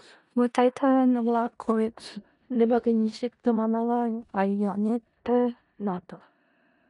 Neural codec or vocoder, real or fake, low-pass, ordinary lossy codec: codec, 16 kHz in and 24 kHz out, 0.4 kbps, LongCat-Audio-Codec, four codebook decoder; fake; 10.8 kHz; none